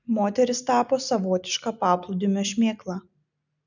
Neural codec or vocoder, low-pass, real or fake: none; 7.2 kHz; real